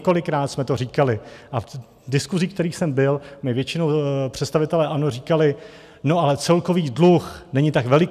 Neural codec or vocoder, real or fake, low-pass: none; real; 14.4 kHz